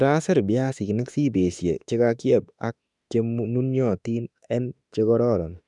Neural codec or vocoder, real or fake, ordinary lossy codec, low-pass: autoencoder, 48 kHz, 32 numbers a frame, DAC-VAE, trained on Japanese speech; fake; none; 10.8 kHz